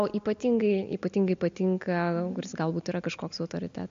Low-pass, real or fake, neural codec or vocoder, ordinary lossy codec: 7.2 kHz; real; none; MP3, 48 kbps